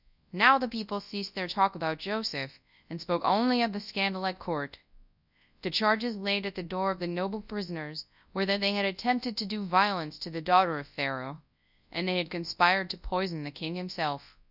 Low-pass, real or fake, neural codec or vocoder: 5.4 kHz; fake; codec, 24 kHz, 0.9 kbps, WavTokenizer, large speech release